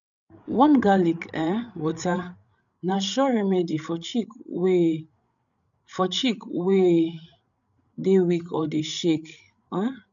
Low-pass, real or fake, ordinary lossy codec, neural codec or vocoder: 7.2 kHz; fake; none; codec, 16 kHz, 8 kbps, FreqCodec, larger model